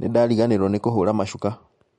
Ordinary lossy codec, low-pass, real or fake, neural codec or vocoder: MP3, 48 kbps; 19.8 kHz; real; none